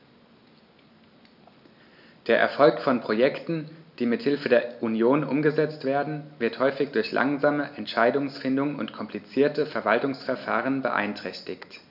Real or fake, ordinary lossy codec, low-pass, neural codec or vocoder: real; none; 5.4 kHz; none